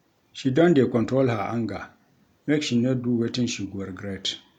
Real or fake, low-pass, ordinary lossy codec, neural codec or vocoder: real; 19.8 kHz; none; none